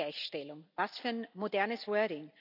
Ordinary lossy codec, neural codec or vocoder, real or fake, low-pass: none; none; real; 5.4 kHz